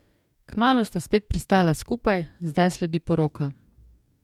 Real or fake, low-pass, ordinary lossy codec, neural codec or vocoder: fake; 19.8 kHz; MP3, 96 kbps; codec, 44.1 kHz, 2.6 kbps, DAC